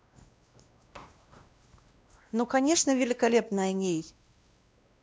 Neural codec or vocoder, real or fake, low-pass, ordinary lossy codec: codec, 16 kHz, 1 kbps, X-Codec, WavLM features, trained on Multilingual LibriSpeech; fake; none; none